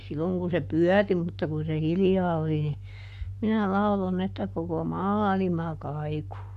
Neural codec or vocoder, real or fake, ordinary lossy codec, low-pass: codec, 44.1 kHz, 7.8 kbps, Pupu-Codec; fake; none; 14.4 kHz